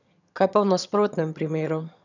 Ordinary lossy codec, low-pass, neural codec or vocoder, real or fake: none; 7.2 kHz; vocoder, 22.05 kHz, 80 mel bands, HiFi-GAN; fake